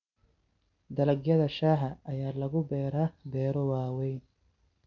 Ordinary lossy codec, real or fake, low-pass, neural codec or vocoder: none; real; 7.2 kHz; none